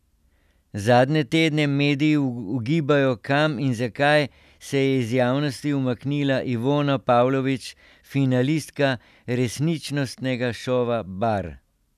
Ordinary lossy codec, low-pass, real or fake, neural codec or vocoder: none; 14.4 kHz; real; none